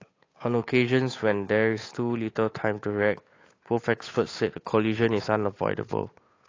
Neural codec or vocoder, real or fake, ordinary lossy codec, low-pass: codec, 16 kHz, 8 kbps, FunCodec, trained on Chinese and English, 25 frames a second; fake; AAC, 32 kbps; 7.2 kHz